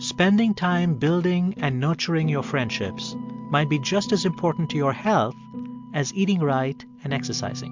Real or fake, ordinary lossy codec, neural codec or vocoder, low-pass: real; MP3, 64 kbps; none; 7.2 kHz